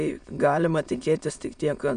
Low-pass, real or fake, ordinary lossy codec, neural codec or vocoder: 9.9 kHz; fake; MP3, 96 kbps; autoencoder, 22.05 kHz, a latent of 192 numbers a frame, VITS, trained on many speakers